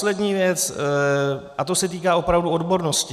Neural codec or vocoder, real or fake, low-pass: none; real; 14.4 kHz